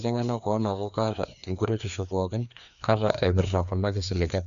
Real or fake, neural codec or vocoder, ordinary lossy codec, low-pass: fake; codec, 16 kHz, 2 kbps, FreqCodec, larger model; none; 7.2 kHz